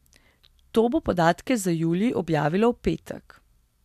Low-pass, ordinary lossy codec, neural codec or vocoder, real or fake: 14.4 kHz; MP3, 96 kbps; none; real